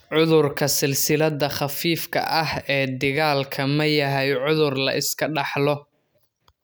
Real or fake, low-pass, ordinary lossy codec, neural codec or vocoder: real; none; none; none